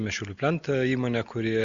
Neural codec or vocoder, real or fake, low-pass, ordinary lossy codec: none; real; 7.2 kHz; Opus, 64 kbps